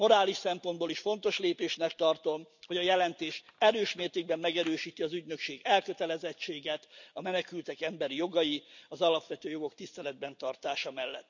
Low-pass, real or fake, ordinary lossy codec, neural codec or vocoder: 7.2 kHz; real; none; none